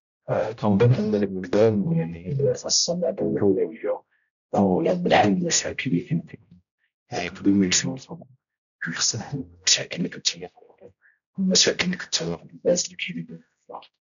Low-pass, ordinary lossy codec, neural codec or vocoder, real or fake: 7.2 kHz; none; codec, 16 kHz, 0.5 kbps, X-Codec, HuBERT features, trained on general audio; fake